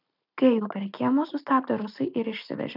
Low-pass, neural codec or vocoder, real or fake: 5.4 kHz; none; real